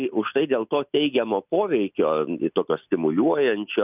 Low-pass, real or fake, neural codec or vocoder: 3.6 kHz; real; none